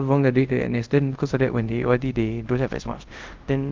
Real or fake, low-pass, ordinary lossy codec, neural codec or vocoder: fake; 7.2 kHz; Opus, 32 kbps; codec, 24 kHz, 0.5 kbps, DualCodec